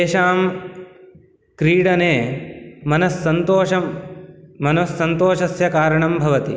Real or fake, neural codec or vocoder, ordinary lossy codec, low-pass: real; none; none; none